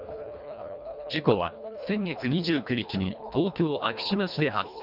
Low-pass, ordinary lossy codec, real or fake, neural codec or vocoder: 5.4 kHz; none; fake; codec, 24 kHz, 1.5 kbps, HILCodec